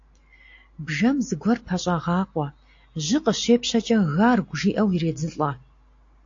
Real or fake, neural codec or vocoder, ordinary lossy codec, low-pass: real; none; AAC, 48 kbps; 7.2 kHz